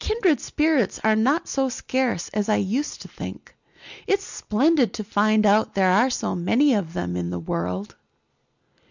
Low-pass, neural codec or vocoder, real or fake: 7.2 kHz; none; real